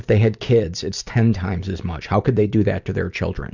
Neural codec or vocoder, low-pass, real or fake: none; 7.2 kHz; real